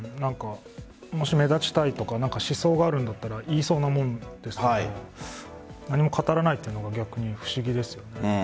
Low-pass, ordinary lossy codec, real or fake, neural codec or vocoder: none; none; real; none